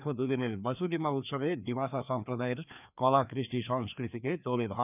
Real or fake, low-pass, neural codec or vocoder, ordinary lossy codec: fake; 3.6 kHz; codec, 16 kHz, 2 kbps, FreqCodec, larger model; none